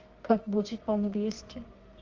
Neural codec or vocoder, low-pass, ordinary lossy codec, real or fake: codec, 24 kHz, 0.9 kbps, WavTokenizer, medium music audio release; 7.2 kHz; Opus, 32 kbps; fake